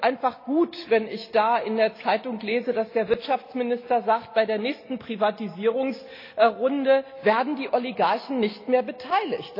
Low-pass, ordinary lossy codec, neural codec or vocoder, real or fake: 5.4 kHz; AAC, 32 kbps; none; real